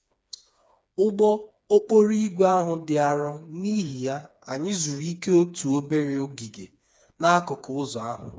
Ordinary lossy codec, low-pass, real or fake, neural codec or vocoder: none; none; fake; codec, 16 kHz, 4 kbps, FreqCodec, smaller model